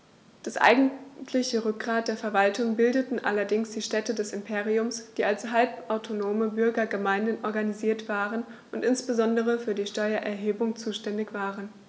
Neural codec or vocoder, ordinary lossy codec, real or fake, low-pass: none; none; real; none